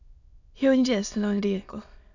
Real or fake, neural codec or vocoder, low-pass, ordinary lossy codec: fake; autoencoder, 22.05 kHz, a latent of 192 numbers a frame, VITS, trained on many speakers; 7.2 kHz; none